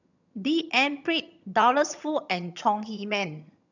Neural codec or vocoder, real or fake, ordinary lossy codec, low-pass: vocoder, 22.05 kHz, 80 mel bands, HiFi-GAN; fake; none; 7.2 kHz